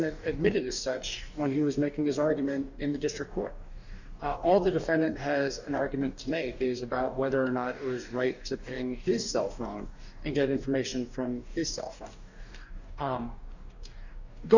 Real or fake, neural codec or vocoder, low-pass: fake; codec, 44.1 kHz, 2.6 kbps, DAC; 7.2 kHz